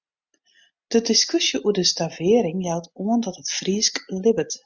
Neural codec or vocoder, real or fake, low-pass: none; real; 7.2 kHz